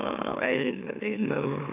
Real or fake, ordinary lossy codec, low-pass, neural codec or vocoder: fake; AAC, 24 kbps; 3.6 kHz; autoencoder, 44.1 kHz, a latent of 192 numbers a frame, MeloTTS